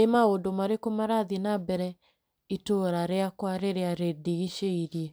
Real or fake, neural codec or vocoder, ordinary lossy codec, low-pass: real; none; none; none